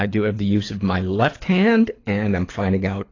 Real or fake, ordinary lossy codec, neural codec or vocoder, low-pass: fake; AAC, 32 kbps; codec, 24 kHz, 6 kbps, HILCodec; 7.2 kHz